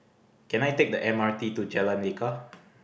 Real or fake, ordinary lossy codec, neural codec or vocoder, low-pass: real; none; none; none